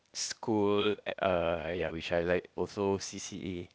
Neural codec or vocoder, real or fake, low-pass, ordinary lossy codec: codec, 16 kHz, 0.8 kbps, ZipCodec; fake; none; none